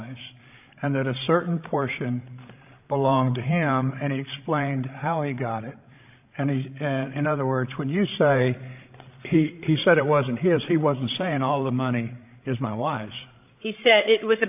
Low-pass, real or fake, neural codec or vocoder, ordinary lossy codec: 3.6 kHz; fake; codec, 16 kHz, 16 kbps, FreqCodec, larger model; AAC, 32 kbps